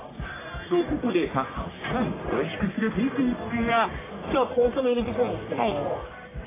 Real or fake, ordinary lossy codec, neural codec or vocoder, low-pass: fake; AAC, 16 kbps; codec, 44.1 kHz, 1.7 kbps, Pupu-Codec; 3.6 kHz